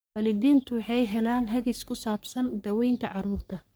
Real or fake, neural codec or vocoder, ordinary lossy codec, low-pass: fake; codec, 44.1 kHz, 3.4 kbps, Pupu-Codec; none; none